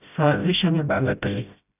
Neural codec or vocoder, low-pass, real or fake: codec, 16 kHz, 0.5 kbps, FreqCodec, smaller model; 3.6 kHz; fake